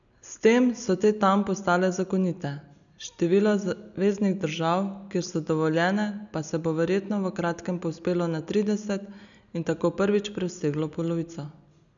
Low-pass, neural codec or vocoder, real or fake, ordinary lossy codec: 7.2 kHz; none; real; none